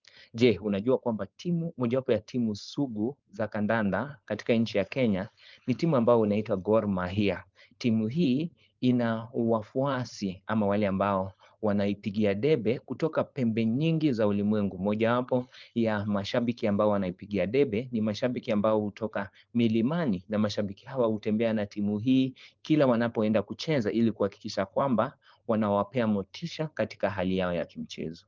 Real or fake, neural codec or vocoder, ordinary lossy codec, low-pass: fake; codec, 16 kHz, 4.8 kbps, FACodec; Opus, 24 kbps; 7.2 kHz